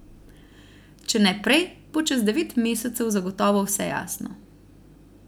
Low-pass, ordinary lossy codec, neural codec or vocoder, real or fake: none; none; none; real